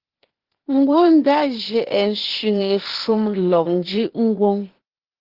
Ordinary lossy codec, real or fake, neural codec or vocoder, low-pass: Opus, 16 kbps; fake; codec, 16 kHz, 0.8 kbps, ZipCodec; 5.4 kHz